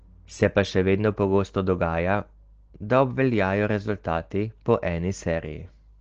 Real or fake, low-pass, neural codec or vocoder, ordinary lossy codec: real; 7.2 kHz; none; Opus, 16 kbps